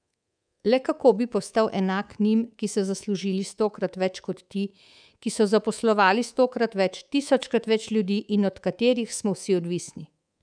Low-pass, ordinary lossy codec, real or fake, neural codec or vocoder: 9.9 kHz; none; fake; codec, 24 kHz, 3.1 kbps, DualCodec